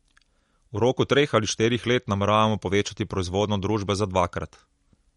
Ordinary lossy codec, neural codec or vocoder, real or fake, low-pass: MP3, 48 kbps; none; real; 19.8 kHz